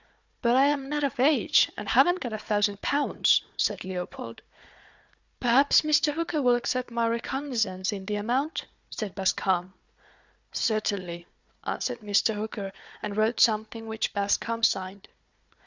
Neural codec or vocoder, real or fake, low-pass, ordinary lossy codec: codec, 16 kHz, 4 kbps, FunCodec, trained on Chinese and English, 50 frames a second; fake; 7.2 kHz; Opus, 64 kbps